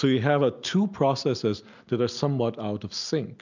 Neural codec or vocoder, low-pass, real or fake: none; 7.2 kHz; real